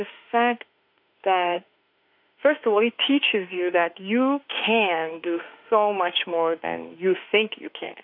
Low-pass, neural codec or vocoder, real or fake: 5.4 kHz; autoencoder, 48 kHz, 32 numbers a frame, DAC-VAE, trained on Japanese speech; fake